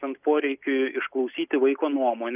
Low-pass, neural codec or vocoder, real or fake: 3.6 kHz; none; real